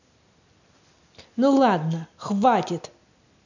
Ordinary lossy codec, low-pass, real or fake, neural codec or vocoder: none; 7.2 kHz; real; none